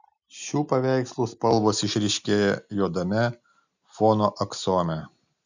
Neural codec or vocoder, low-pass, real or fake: none; 7.2 kHz; real